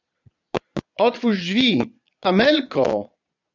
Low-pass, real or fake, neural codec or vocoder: 7.2 kHz; fake; vocoder, 22.05 kHz, 80 mel bands, Vocos